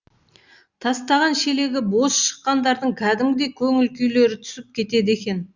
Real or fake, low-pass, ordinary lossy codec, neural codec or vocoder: real; 7.2 kHz; Opus, 64 kbps; none